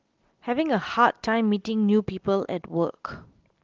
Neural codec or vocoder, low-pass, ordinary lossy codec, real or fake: none; 7.2 kHz; Opus, 16 kbps; real